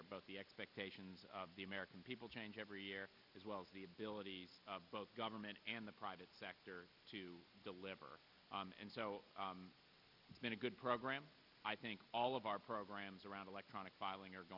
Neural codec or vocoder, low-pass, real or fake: none; 5.4 kHz; real